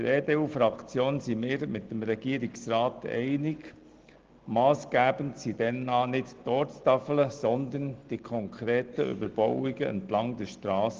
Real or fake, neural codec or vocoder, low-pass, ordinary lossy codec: real; none; 7.2 kHz; Opus, 16 kbps